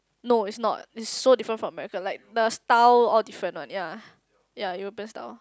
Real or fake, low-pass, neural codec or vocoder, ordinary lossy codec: real; none; none; none